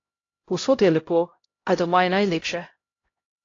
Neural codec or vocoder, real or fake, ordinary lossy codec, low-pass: codec, 16 kHz, 0.5 kbps, X-Codec, HuBERT features, trained on LibriSpeech; fake; AAC, 32 kbps; 7.2 kHz